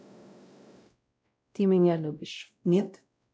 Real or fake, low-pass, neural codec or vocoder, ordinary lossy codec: fake; none; codec, 16 kHz, 0.5 kbps, X-Codec, WavLM features, trained on Multilingual LibriSpeech; none